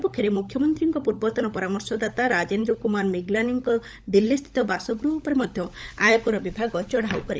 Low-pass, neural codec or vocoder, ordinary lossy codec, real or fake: none; codec, 16 kHz, 16 kbps, FunCodec, trained on LibriTTS, 50 frames a second; none; fake